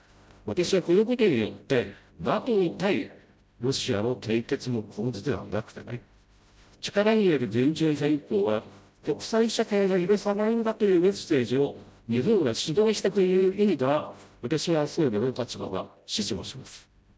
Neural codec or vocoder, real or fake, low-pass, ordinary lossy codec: codec, 16 kHz, 0.5 kbps, FreqCodec, smaller model; fake; none; none